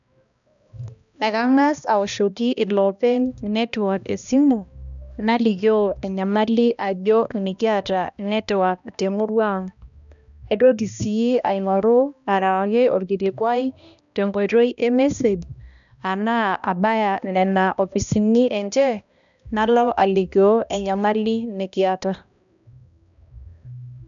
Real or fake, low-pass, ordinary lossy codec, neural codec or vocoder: fake; 7.2 kHz; none; codec, 16 kHz, 1 kbps, X-Codec, HuBERT features, trained on balanced general audio